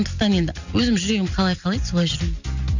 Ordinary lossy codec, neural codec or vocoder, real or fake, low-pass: none; none; real; 7.2 kHz